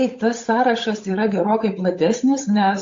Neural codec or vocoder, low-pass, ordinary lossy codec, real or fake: codec, 16 kHz, 8 kbps, FunCodec, trained on Chinese and English, 25 frames a second; 7.2 kHz; AAC, 48 kbps; fake